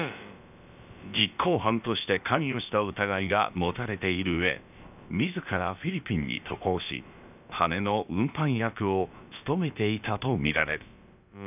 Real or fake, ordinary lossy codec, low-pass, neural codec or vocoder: fake; none; 3.6 kHz; codec, 16 kHz, about 1 kbps, DyCAST, with the encoder's durations